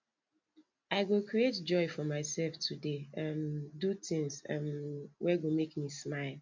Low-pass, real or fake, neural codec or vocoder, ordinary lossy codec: 7.2 kHz; real; none; MP3, 48 kbps